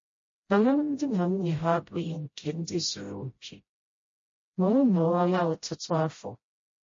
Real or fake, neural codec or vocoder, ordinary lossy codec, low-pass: fake; codec, 16 kHz, 0.5 kbps, FreqCodec, smaller model; MP3, 32 kbps; 7.2 kHz